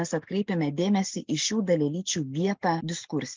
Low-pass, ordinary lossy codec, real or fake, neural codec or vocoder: 7.2 kHz; Opus, 32 kbps; real; none